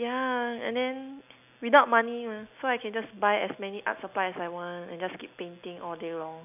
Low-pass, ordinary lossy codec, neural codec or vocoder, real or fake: 3.6 kHz; none; none; real